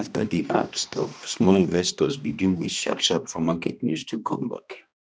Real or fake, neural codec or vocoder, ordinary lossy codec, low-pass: fake; codec, 16 kHz, 1 kbps, X-Codec, HuBERT features, trained on balanced general audio; none; none